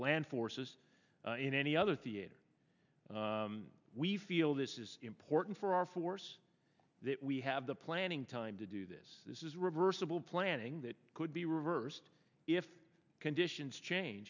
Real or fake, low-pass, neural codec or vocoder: real; 7.2 kHz; none